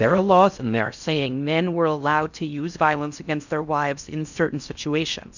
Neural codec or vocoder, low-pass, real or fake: codec, 16 kHz in and 24 kHz out, 0.6 kbps, FocalCodec, streaming, 4096 codes; 7.2 kHz; fake